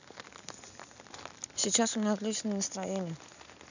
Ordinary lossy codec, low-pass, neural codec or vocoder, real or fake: none; 7.2 kHz; none; real